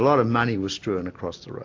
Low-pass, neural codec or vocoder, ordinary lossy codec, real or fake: 7.2 kHz; none; AAC, 48 kbps; real